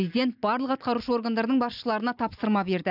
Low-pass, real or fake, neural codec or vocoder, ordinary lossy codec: 5.4 kHz; real; none; none